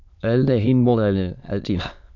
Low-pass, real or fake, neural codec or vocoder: 7.2 kHz; fake; autoencoder, 22.05 kHz, a latent of 192 numbers a frame, VITS, trained on many speakers